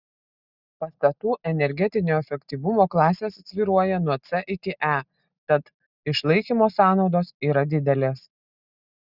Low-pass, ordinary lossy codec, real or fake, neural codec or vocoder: 5.4 kHz; Opus, 64 kbps; real; none